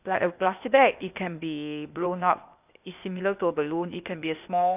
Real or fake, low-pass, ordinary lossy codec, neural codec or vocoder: fake; 3.6 kHz; none; codec, 16 kHz, 0.8 kbps, ZipCodec